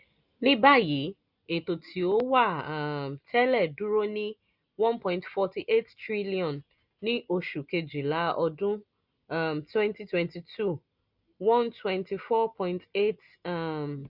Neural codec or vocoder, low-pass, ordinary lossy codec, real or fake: none; 5.4 kHz; none; real